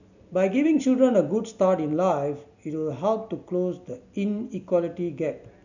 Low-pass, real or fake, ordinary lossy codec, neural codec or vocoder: 7.2 kHz; real; none; none